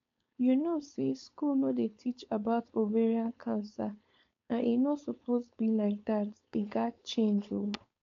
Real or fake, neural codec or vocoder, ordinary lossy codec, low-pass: fake; codec, 16 kHz, 4.8 kbps, FACodec; AAC, 48 kbps; 7.2 kHz